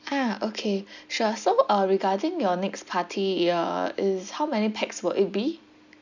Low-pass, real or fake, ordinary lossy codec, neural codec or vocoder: 7.2 kHz; real; none; none